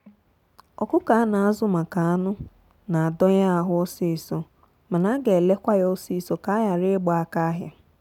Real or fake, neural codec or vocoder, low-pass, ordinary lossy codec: real; none; 19.8 kHz; none